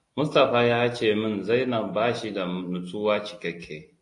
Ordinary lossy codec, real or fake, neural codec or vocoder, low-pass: AAC, 48 kbps; real; none; 10.8 kHz